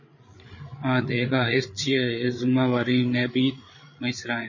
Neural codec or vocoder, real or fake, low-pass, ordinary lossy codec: codec, 16 kHz, 8 kbps, FreqCodec, larger model; fake; 7.2 kHz; MP3, 32 kbps